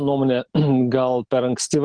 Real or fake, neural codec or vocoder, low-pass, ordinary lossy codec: real; none; 9.9 kHz; Opus, 16 kbps